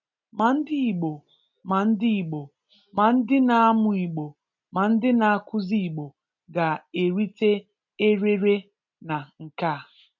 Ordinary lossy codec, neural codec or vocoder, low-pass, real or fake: none; none; 7.2 kHz; real